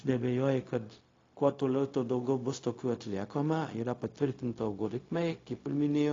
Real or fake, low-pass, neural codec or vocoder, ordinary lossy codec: fake; 7.2 kHz; codec, 16 kHz, 0.4 kbps, LongCat-Audio-Codec; AAC, 32 kbps